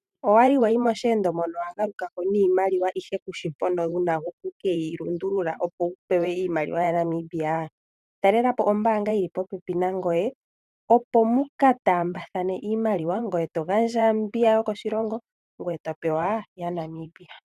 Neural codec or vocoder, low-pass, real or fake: vocoder, 44.1 kHz, 128 mel bands every 512 samples, BigVGAN v2; 14.4 kHz; fake